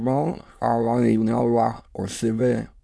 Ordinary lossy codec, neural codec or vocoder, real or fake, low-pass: none; autoencoder, 22.05 kHz, a latent of 192 numbers a frame, VITS, trained on many speakers; fake; none